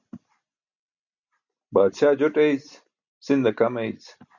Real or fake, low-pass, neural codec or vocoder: real; 7.2 kHz; none